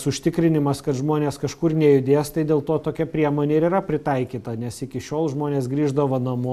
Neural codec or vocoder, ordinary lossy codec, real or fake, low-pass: none; AAC, 96 kbps; real; 14.4 kHz